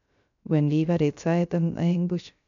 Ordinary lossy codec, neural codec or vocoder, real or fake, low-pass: none; codec, 16 kHz, 0.3 kbps, FocalCodec; fake; 7.2 kHz